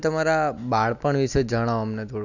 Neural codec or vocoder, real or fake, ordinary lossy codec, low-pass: none; real; none; 7.2 kHz